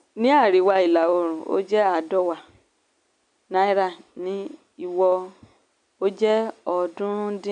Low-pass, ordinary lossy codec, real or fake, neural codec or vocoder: 9.9 kHz; MP3, 96 kbps; real; none